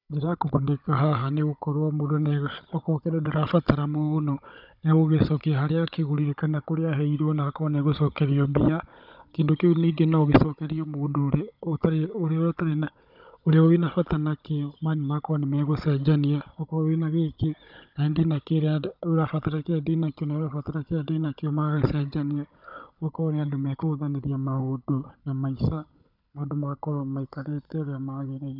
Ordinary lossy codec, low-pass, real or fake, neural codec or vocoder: none; 5.4 kHz; fake; codec, 16 kHz, 16 kbps, FunCodec, trained on Chinese and English, 50 frames a second